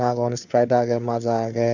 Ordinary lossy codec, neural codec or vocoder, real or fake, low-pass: none; codec, 16 kHz, 4 kbps, FunCodec, trained on LibriTTS, 50 frames a second; fake; 7.2 kHz